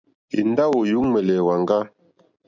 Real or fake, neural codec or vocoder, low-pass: real; none; 7.2 kHz